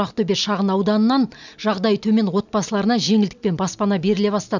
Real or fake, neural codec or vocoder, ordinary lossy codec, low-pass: real; none; none; 7.2 kHz